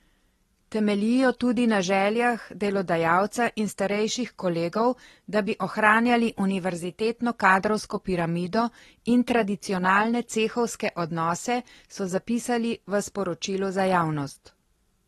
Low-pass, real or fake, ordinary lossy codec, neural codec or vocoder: 19.8 kHz; real; AAC, 32 kbps; none